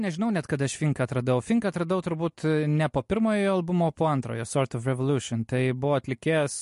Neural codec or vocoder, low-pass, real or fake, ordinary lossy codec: none; 14.4 kHz; real; MP3, 48 kbps